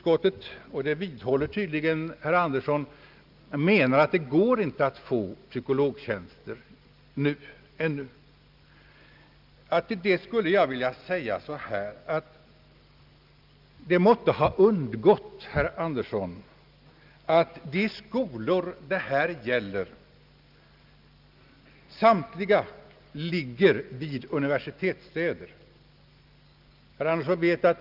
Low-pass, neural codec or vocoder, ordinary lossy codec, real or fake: 5.4 kHz; none; Opus, 24 kbps; real